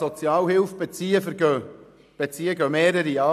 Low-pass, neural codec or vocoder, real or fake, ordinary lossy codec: 14.4 kHz; none; real; none